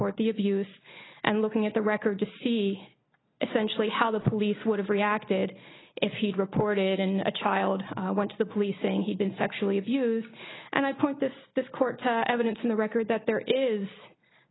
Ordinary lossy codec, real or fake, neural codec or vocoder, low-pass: AAC, 16 kbps; real; none; 7.2 kHz